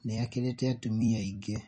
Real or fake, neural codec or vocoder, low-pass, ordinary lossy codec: fake; vocoder, 44.1 kHz, 128 mel bands every 256 samples, BigVGAN v2; 10.8 kHz; MP3, 32 kbps